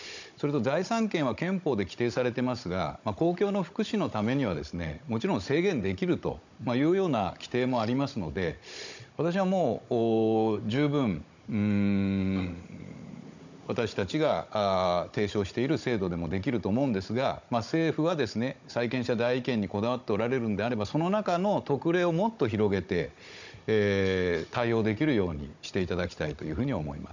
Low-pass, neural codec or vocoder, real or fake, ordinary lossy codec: 7.2 kHz; codec, 16 kHz, 16 kbps, FunCodec, trained on Chinese and English, 50 frames a second; fake; none